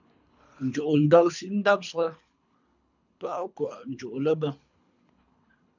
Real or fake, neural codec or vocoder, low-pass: fake; codec, 24 kHz, 3 kbps, HILCodec; 7.2 kHz